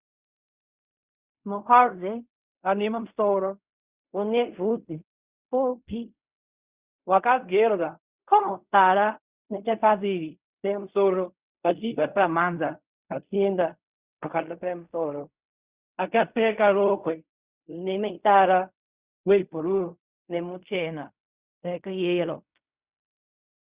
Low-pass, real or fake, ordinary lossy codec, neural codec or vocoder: 3.6 kHz; fake; Opus, 64 kbps; codec, 16 kHz in and 24 kHz out, 0.4 kbps, LongCat-Audio-Codec, fine tuned four codebook decoder